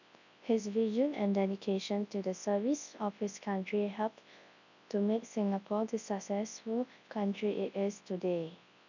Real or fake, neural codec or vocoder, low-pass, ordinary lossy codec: fake; codec, 24 kHz, 0.9 kbps, WavTokenizer, large speech release; 7.2 kHz; none